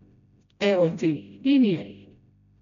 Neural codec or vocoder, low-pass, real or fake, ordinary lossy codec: codec, 16 kHz, 0.5 kbps, FreqCodec, smaller model; 7.2 kHz; fake; none